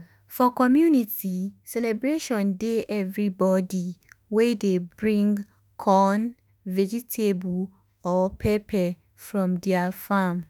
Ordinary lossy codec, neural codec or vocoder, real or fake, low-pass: none; autoencoder, 48 kHz, 32 numbers a frame, DAC-VAE, trained on Japanese speech; fake; none